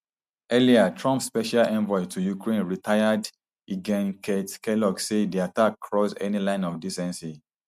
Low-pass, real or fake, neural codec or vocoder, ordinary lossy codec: 14.4 kHz; real; none; MP3, 96 kbps